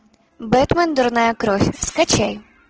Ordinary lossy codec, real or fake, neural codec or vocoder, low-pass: Opus, 16 kbps; real; none; 7.2 kHz